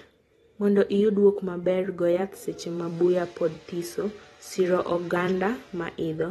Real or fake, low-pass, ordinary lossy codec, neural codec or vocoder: fake; 19.8 kHz; AAC, 32 kbps; vocoder, 44.1 kHz, 128 mel bands every 256 samples, BigVGAN v2